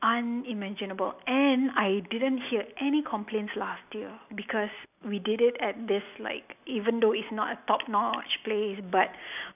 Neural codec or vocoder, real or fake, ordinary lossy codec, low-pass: none; real; none; 3.6 kHz